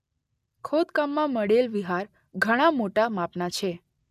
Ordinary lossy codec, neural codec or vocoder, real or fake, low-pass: none; none; real; 14.4 kHz